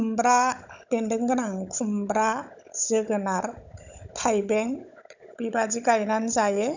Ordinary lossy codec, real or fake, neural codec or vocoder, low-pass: none; fake; codec, 16 kHz, 16 kbps, FunCodec, trained on Chinese and English, 50 frames a second; 7.2 kHz